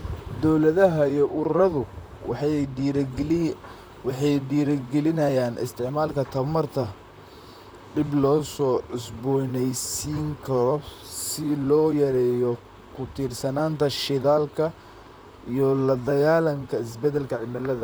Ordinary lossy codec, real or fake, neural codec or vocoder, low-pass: none; fake; vocoder, 44.1 kHz, 128 mel bands, Pupu-Vocoder; none